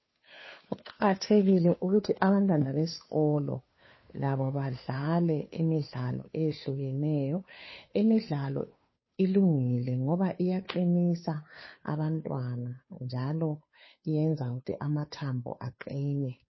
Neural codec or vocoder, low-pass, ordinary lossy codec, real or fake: codec, 16 kHz, 2 kbps, FunCodec, trained on Chinese and English, 25 frames a second; 7.2 kHz; MP3, 24 kbps; fake